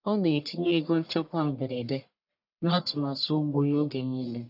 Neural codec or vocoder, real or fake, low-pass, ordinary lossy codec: codec, 44.1 kHz, 1.7 kbps, Pupu-Codec; fake; 5.4 kHz; none